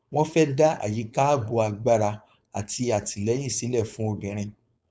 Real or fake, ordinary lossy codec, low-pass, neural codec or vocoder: fake; none; none; codec, 16 kHz, 4.8 kbps, FACodec